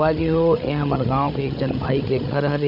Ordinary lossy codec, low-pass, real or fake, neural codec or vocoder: none; 5.4 kHz; fake; codec, 16 kHz, 16 kbps, FreqCodec, larger model